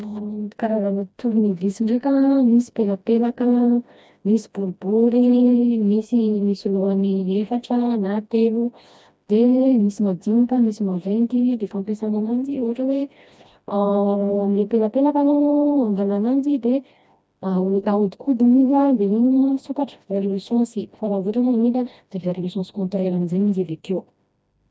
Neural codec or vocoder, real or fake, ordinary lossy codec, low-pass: codec, 16 kHz, 1 kbps, FreqCodec, smaller model; fake; none; none